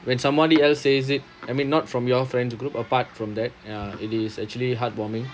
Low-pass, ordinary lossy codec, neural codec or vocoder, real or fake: none; none; none; real